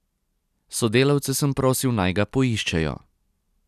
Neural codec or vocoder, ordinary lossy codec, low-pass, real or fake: none; none; 14.4 kHz; real